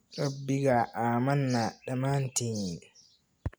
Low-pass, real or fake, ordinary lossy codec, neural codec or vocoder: none; real; none; none